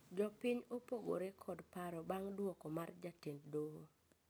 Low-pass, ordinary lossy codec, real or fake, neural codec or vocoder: none; none; real; none